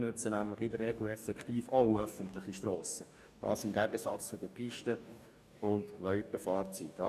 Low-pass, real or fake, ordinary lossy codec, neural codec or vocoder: 14.4 kHz; fake; none; codec, 44.1 kHz, 2.6 kbps, DAC